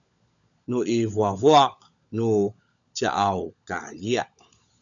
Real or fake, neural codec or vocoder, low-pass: fake; codec, 16 kHz, 16 kbps, FunCodec, trained on LibriTTS, 50 frames a second; 7.2 kHz